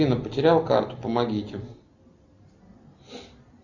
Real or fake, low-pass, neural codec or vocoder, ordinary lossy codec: real; 7.2 kHz; none; Opus, 64 kbps